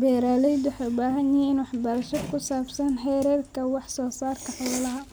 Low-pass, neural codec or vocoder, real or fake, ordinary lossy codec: none; none; real; none